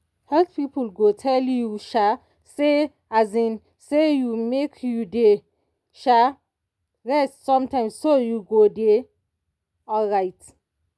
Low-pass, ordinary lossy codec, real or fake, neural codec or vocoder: none; none; real; none